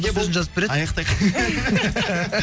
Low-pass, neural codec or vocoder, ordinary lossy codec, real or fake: none; none; none; real